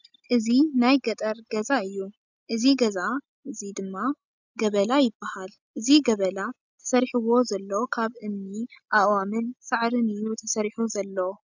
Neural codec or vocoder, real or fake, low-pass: none; real; 7.2 kHz